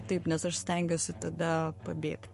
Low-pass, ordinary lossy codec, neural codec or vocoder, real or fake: 14.4 kHz; MP3, 48 kbps; codec, 44.1 kHz, 7.8 kbps, Pupu-Codec; fake